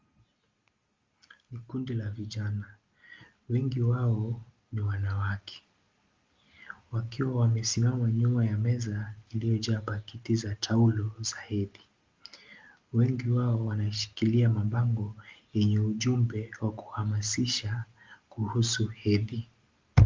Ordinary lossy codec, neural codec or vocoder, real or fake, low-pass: Opus, 32 kbps; none; real; 7.2 kHz